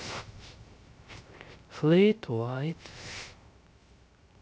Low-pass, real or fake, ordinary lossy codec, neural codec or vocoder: none; fake; none; codec, 16 kHz, 0.3 kbps, FocalCodec